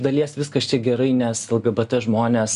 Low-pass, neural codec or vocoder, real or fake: 10.8 kHz; none; real